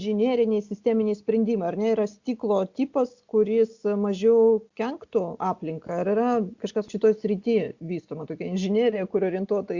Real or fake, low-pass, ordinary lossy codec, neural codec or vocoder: real; 7.2 kHz; Opus, 64 kbps; none